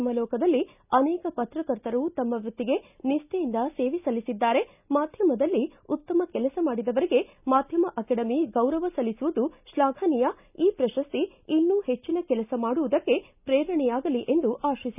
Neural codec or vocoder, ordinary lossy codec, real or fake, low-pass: none; none; real; 3.6 kHz